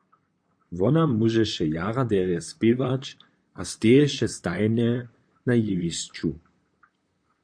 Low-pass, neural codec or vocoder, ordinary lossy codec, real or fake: 9.9 kHz; vocoder, 44.1 kHz, 128 mel bands, Pupu-Vocoder; AAC, 64 kbps; fake